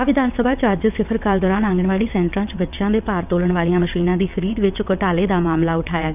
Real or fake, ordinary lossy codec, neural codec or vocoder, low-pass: fake; none; vocoder, 22.05 kHz, 80 mel bands, WaveNeXt; 3.6 kHz